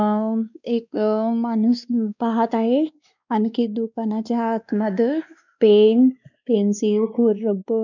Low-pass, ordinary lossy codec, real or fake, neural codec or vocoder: 7.2 kHz; none; fake; codec, 16 kHz, 2 kbps, X-Codec, WavLM features, trained on Multilingual LibriSpeech